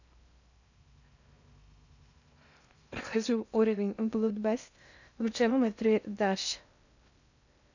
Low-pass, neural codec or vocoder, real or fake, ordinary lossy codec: 7.2 kHz; codec, 16 kHz in and 24 kHz out, 0.6 kbps, FocalCodec, streaming, 2048 codes; fake; none